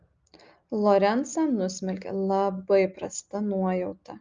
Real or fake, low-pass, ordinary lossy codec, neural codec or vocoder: real; 7.2 kHz; Opus, 24 kbps; none